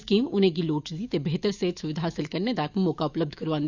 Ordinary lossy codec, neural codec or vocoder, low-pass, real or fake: Opus, 64 kbps; autoencoder, 48 kHz, 128 numbers a frame, DAC-VAE, trained on Japanese speech; 7.2 kHz; fake